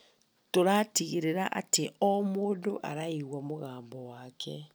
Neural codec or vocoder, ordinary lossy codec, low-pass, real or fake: none; none; none; real